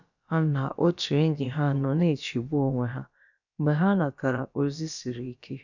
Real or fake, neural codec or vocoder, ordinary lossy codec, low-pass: fake; codec, 16 kHz, about 1 kbps, DyCAST, with the encoder's durations; none; 7.2 kHz